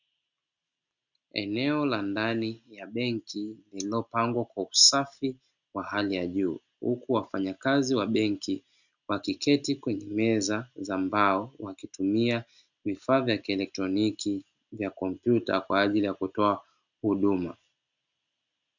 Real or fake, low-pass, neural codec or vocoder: real; 7.2 kHz; none